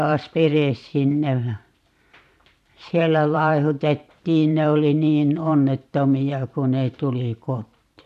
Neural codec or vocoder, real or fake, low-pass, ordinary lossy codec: vocoder, 44.1 kHz, 128 mel bands every 512 samples, BigVGAN v2; fake; 14.4 kHz; none